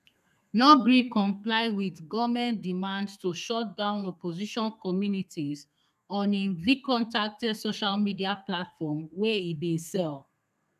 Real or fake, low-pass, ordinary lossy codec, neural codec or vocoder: fake; 14.4 kHz; none; codec, 32 kHz, 1.9 kbps, SNAC